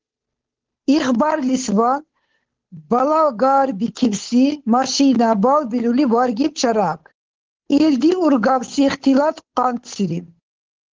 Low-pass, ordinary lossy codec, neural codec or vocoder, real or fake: 7.2 kHz; Opus, 16 kbps; codec, 16 kHz, 8 kbps, FunCodec, trained on Chinese and English, 25 frames a second; fake